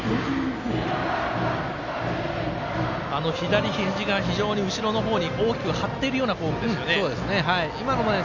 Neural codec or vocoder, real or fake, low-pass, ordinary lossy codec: none; real; 7.2 kHz; none